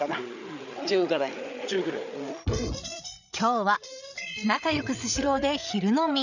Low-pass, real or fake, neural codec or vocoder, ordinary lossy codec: 7.2 kHz; fake; codec, 16 kHz, 8 kbps, FreqCodec, larger model; none